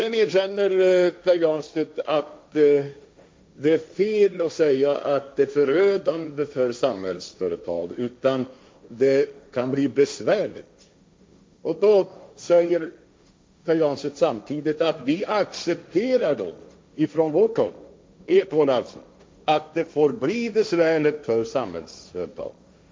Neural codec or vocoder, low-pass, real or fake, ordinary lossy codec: codec, 16 kHz, 1.1 kbps, Voila-Tokenizer; none; fake; none